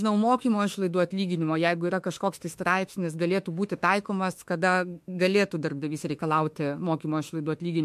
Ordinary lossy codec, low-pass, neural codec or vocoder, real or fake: MP3, 64 kbps; 14.4 kHz; autoencoder, 48 kHz, 32 numbers a frame, DAC-VAE, trained on Japanese speech; fake